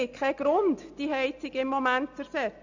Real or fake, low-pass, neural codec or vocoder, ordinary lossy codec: real; 7.2 kHz; none; Opus, 64 kbps